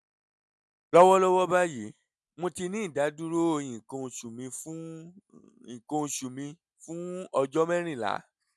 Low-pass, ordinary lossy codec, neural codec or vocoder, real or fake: none; none; none; real